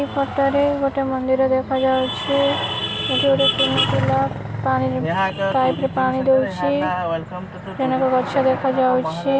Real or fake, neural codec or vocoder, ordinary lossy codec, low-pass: real; none; none; none